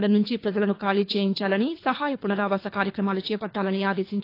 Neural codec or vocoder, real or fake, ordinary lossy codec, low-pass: codec, 24 kHz, 3 kbps, HILCodec; fake; AAC, 32 kbps; 5.4 kHz